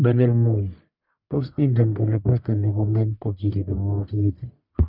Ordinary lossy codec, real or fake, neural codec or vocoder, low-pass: none; fake; codec, 44.1 kHz, 1.7 kbps, Pupu-Codec; 5.4 kHz